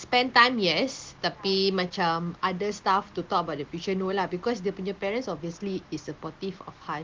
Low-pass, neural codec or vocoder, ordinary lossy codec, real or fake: 7.2 kHz; none; Opus, 16 kbps; real